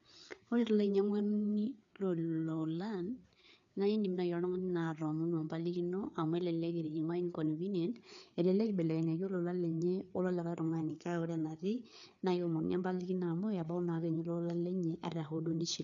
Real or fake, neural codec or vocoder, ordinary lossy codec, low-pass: fake; codec, 16 kHz, 4 kbps, FreqCodec, larger model; none; 7.2 kHz